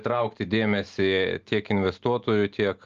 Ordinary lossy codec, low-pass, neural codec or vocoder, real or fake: Opus, 32 kbps; 7.2 kHz; none; real